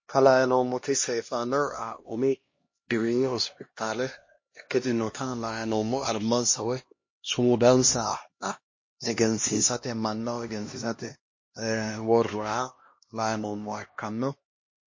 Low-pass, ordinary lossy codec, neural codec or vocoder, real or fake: 7.2 kHz; MP3, 32 kbps; codec, 16 kHz, 1 kbps, X-Codec, HuBERT features, trained on LibriSpeech; fake